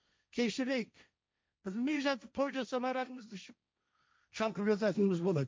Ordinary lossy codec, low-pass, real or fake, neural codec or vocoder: none; none; fake; codec, 16 kHz, 1.1 kbps, Voila-Tokenizer